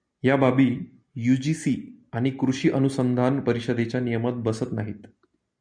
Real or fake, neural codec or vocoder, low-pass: real; none; 9.9 kHz